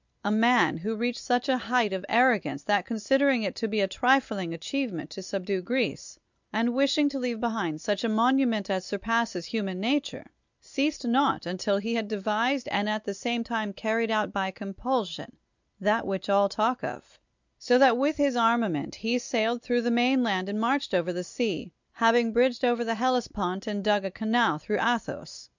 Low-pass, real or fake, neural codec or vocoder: 7.2 kHz; real; none